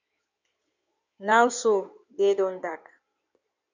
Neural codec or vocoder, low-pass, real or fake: codec, 16 kHz in and 24 kHz out, 2.2 kbps, FireRedTTS-2 codec; 7.2 kHz; fake